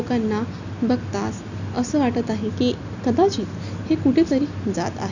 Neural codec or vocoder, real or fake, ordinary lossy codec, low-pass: none; real; none; 7.2 kHz